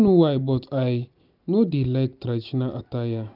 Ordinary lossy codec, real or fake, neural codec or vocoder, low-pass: none; real; none; 5.4 kHz